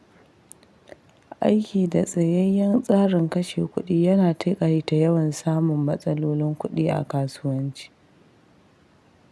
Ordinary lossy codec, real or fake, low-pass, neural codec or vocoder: none; real; none; none